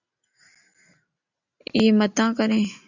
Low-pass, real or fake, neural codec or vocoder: 7.2 kHz; real; none